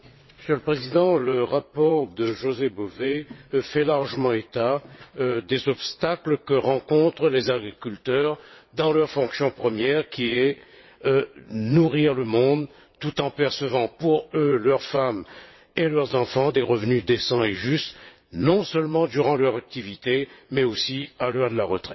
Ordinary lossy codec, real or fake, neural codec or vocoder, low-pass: MP3, 24 kbps; fake; vocoder, 22.05 kHz, 80 mel bands, WaveNeXt; 7.2 kHz